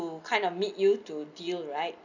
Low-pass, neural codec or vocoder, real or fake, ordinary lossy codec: 7.2 kHz; none; real; none